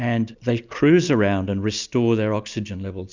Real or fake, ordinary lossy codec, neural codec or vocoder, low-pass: real; Opus, 64 kbps; none; 7.2 kHz